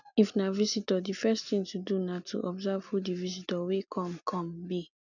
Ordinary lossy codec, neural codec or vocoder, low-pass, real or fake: none; none; 7.2 kHz; real